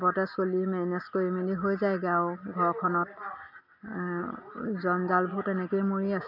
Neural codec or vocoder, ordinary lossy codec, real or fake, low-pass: none; none; real; 5.4 kHz